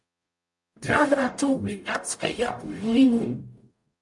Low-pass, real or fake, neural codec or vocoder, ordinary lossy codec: 10.8 kHz; fake; codec, 44.1 kHz, 0.9 kbps, DAC; MP3, 64 kbps